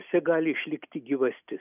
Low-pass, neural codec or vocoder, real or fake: 3.6 kHz; none; real